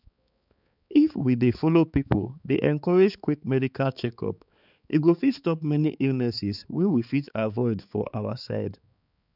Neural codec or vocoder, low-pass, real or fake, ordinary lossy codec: codec, 16 kHz, 4 kbps, X-Codec, HuBERT features, trained on balanced general audio; 5.4 kHz; fake; none